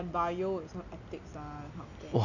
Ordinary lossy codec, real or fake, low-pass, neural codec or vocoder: none; real; 7.2 kHz; none